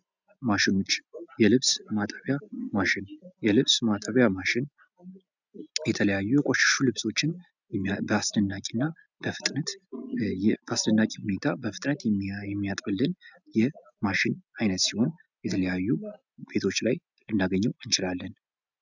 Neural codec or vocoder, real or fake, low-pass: none; real; 7.2 kHz